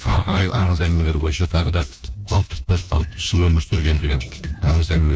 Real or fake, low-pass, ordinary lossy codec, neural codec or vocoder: fake; none; none; codec, 16 kHz, 1 kbps, FunCodec, trained on LibriTTS, 50 frames a second